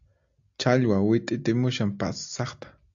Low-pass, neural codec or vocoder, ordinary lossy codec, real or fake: 7.2 kHz; none; AAC, 64 kbps; real